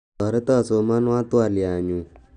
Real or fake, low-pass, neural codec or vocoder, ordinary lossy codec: real; 9.9 kHz; none; none